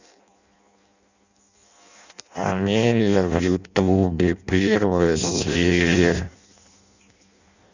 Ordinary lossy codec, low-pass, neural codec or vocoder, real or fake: none; 7.2 kHz; codec, 16 kHz in and 24 kHz out, 0.6 kbps, FireRedTTS-2 codec; fake